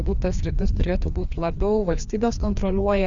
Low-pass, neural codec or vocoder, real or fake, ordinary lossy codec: 7.2 kHz; codec, 16 kHz, 2 kbps, FreqCodec, larger model; fake; Opus, 64 kbps